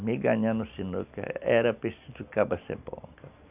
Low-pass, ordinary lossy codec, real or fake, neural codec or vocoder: 3.6 kHz; none; real; none